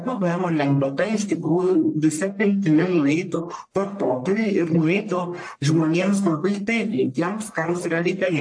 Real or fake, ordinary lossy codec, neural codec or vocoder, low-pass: fake; AAC, 64 kbps; codec, 44.1 kHz, 1.7 kbps, Pupu-Codec; 9.9 kHz